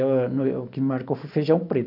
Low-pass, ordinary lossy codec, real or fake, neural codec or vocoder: 5.4 kHz; none; real; none